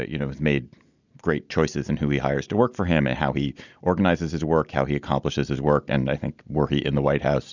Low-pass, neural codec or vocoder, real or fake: 7.2 kHz; none; real